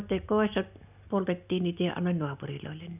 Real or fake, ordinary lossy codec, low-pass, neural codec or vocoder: real; none; 3.6 kHz; none